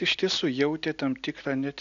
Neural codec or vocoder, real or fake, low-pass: none; real; 7.2 kHz